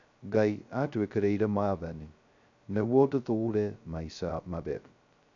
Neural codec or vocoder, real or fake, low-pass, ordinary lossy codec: codec, 16 kHz, 0.2 kbps, FocalCodec; fake; 7.2 kHz; none